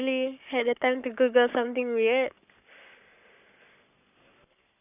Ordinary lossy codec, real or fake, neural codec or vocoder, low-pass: none; fake; codec, 44.1 kHz, 7.8 kbps, Pupu-Codec; 3.6 kHz